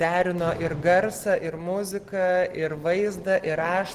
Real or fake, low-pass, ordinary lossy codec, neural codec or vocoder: real; 14.4 kHz; Opus, 16 kbps; none